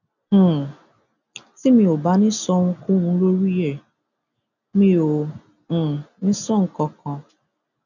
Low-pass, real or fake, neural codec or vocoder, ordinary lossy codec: 7.2 kHz; real; none; none